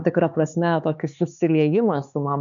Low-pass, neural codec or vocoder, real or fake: 7.2 kHz; codec, 16 kHz, 4 kbps, X-Codec, HuBERT features, trained on LibriSpeech; fake